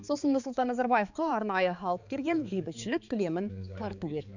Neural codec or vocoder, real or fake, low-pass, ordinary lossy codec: codec, 16 kHz, 4 kbps, X-Codec, HuBERT features, trained on balanced general audio; fake; 7.2 kHz; none